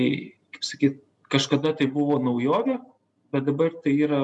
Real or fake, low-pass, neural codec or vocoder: real; 10.8 kHz; none